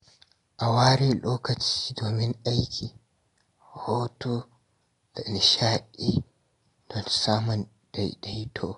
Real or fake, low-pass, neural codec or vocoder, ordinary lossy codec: real; 10.8 kHz; none; AAC, 48 kbps